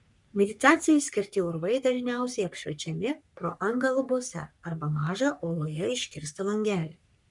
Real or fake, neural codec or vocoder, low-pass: fake; codec, 44.1 kHz, 3.4 kbps, Pupu-Codec; 10.8 kHz